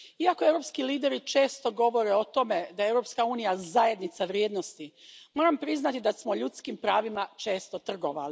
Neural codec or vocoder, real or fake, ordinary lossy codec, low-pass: none; real; none; none